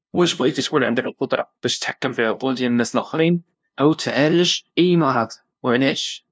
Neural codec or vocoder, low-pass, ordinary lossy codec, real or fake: codec, 16 kHz, 0.5 kbps, FunCodec, trained on LibriTTS, 25 frames a second; none; none; fake